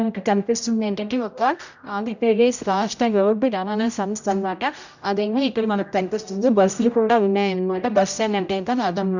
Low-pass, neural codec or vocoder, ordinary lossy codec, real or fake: 7.2 kHz; codec, 16 kHz, 0.5 kbps, X-Codec, HuBERT features, trained on general audio; none; fake